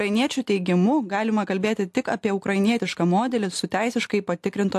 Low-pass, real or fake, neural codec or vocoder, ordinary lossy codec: 14.4 kHz; real; none; AAC, 64 kbps